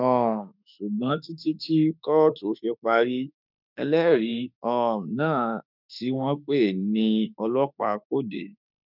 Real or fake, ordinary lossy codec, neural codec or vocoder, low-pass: fake; none; autoencoder, 48 kHz, 32 numbers a frame, DAC-VAE, trained on Japanese speech; 5.4 kHz